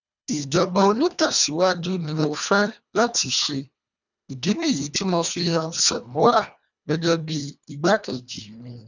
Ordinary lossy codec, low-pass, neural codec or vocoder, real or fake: none; 7.2 kHz; codec, 24 kHz, 1.5 kbps, HILCodec; fake